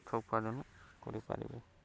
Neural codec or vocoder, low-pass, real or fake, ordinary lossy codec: none; none; real; none